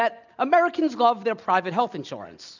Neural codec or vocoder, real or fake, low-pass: none; real; 7.2 kHz